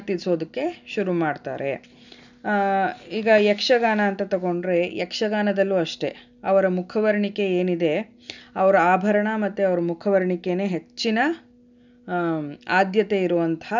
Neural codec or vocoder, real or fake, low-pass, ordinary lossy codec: none; real; 7.2 kHz; none